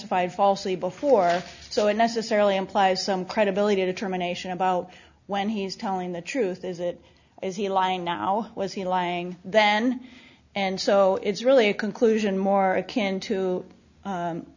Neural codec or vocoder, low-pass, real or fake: none; 7.2 kHz; real